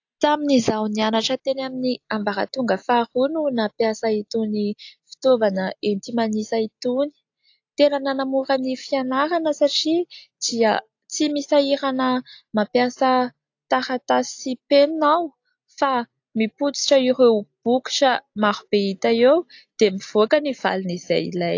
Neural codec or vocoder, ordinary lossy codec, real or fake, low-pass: none; AAC, 48 kbps; real; 7.2 kHz